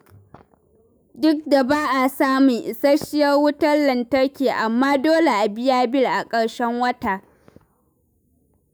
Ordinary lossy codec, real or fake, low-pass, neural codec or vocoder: none; fake; none; autoencoder, 48 kHz, 128 numbers a frame, DAC-VAE, trained on Japanese speech